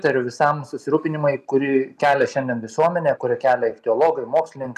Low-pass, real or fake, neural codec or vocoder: 14.4 kHz; real; none